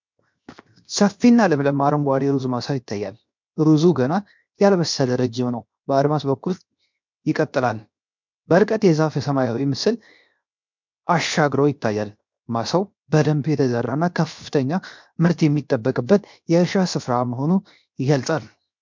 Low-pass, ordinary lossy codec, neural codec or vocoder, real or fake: 7.2 kHz; MP3, 64 kbps; codec, 16 kHz, 0.7 kbps, FocalCodec; fake